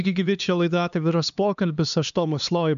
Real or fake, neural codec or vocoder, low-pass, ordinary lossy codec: fake; codec, 16 kHz, 2 kbps, X-Codec, HuBERT features, trained on LibriSpeech; 7.2 kHz; AAC, 96 kbps